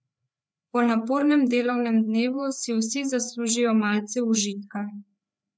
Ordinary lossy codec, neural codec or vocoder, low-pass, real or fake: none; codec, 16 kHz, 4 kbps, FreqCodec, larger model; none; fake